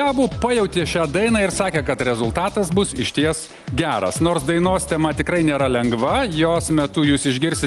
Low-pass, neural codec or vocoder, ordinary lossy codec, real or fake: 10.8 kHz; none; Opus, 24 kbps; real